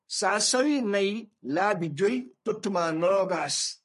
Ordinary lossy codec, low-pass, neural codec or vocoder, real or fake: MP3, 48 kbps; 14.4 kHz; codec, 44.1 kHz, 3.4 kbps, Pupu-Codec; fake